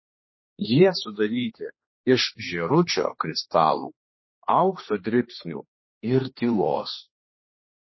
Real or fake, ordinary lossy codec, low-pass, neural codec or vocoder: fake; MP3, 24 kbps; 7.2 kHz; codec, 16 kHz, 2 kbps, X-Codec, HuBERT features, trained on general audio